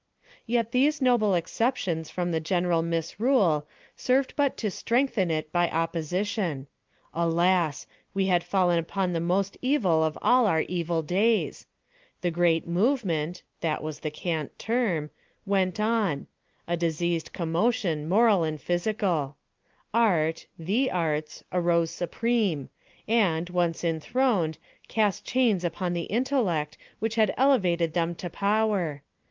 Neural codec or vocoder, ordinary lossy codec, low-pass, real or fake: none; Opus, 24 kbps; 7.2 kHz; real